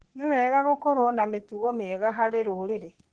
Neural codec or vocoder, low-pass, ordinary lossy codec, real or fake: codec, 44.1 kHz, 3.4 kbps, Pupu-Codec; 9.9 kHz; Opus, 16 kbps; fake